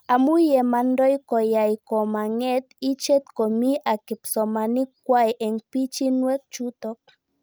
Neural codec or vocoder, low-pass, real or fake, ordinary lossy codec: none; none; real; none